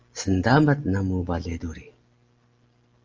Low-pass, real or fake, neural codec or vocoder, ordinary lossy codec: 7.2 kHz; real; none; Opus, 24 kbps